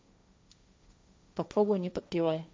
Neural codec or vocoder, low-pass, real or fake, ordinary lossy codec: codec, 16 kHz, 1.1 kbps, Voila-Tokenizer; none; fake; none